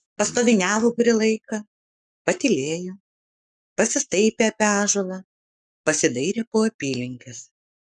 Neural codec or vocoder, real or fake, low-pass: codec, 44.1 kHz, 7.8 kbps, Pupu-Codec; fake; 10.8 kHz